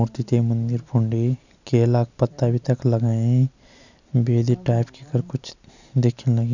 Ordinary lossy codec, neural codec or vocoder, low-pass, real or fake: none; none; 7.2 kHz; real